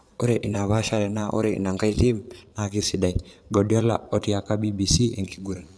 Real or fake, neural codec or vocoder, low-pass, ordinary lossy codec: fake; vocoder, 22.05 kHz, 80 mel bands, Vocos; none; none